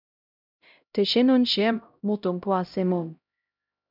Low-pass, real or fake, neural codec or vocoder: 5.4 kHz; fake; codec, 16 kHz, 0.5 kbps, X-Codec, HuBERT features, trained on LibriSpeech